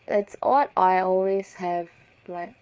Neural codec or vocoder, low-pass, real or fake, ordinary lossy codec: codec, 16 kHz, 4 kbps, FreqCodec, larger model; none; fake; none